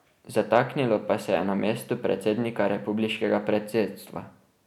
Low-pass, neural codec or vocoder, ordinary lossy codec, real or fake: 19.8 kHz; none; none; real